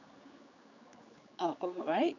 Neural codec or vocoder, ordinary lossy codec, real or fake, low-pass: codec, 16 kHz, 4 kbps, X-Codec, HuBERT features, trained on general audio; none; fake; 7.2 kHz